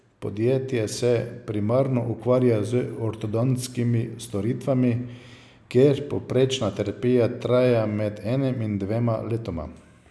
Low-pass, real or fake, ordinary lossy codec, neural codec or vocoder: none; real; none; none